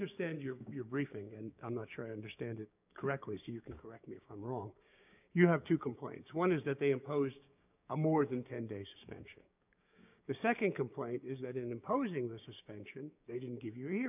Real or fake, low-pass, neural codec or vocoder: fake; 3.6 kHz; codec, 16 kHz, 6 kbps, DAC